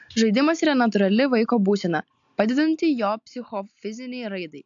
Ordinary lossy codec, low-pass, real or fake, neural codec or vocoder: AAC, 64 kbps; 7.2 kHz; real; none